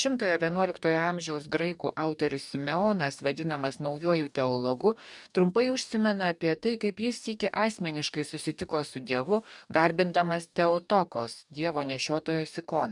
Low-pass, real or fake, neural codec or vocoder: 10.8 kHz; fake; codec, 44.1 kHz, 2.6 kbps, DAC